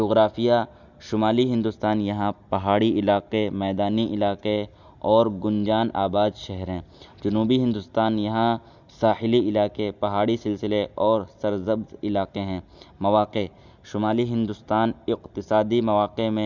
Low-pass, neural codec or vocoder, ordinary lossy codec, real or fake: 7.2 kHz; none; none; real